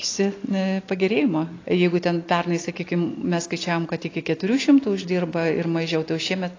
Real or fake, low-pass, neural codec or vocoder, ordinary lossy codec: real; 7.2 kHz; none; AAC, 32 kbps